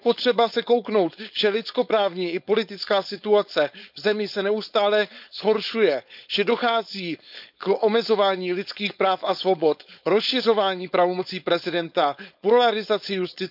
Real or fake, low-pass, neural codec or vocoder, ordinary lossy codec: fake; 5.4 kHz; codec, 16 kHz, 4.8 kbps, FACodec; none